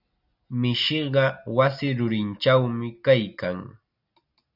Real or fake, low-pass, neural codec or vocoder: real; 5.4 kHz; none